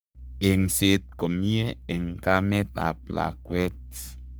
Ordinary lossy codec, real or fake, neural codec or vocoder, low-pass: none; fake; codec, 44.1 kHz, 3.4 kbps, Pupu-Codec; none